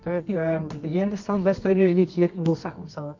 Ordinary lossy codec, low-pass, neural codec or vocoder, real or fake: MP3, 48 kbps; 7.2 kHz; codec, 24 kHz, 0.9 kbps, WavTokenizer, medium music audio release; fake